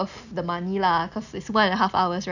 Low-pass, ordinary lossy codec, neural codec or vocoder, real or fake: 7.2 kHz; none; none; real